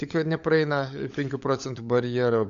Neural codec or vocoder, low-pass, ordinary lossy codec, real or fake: codec, 16 kHz, 16 kbps, FunCodec, trained on LibriTTS, 50 frames a second; 7.2 kHz; MP3, 64 kbps; fake